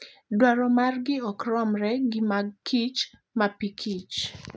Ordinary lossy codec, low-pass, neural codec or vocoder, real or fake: none; none; none; real